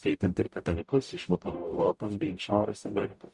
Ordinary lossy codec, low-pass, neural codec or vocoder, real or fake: Opus, 64 kbps; 10.8 kHz; codec, 44.1 kHz, 0.9 kbps, DAC; fake